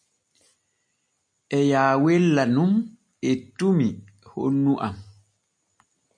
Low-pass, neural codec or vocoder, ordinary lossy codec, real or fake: 9.9 kHz; none; MP3, 96 kbps; real